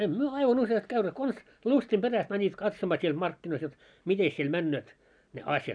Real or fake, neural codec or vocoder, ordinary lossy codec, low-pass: real; none; none; 9.9 kHz